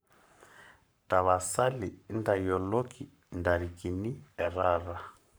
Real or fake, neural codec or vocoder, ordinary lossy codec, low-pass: fake; codec, 44.1 kHz, 7.8 kbps, Pupu-Codec; none; none